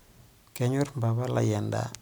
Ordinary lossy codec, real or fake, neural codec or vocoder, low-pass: none; real; none; none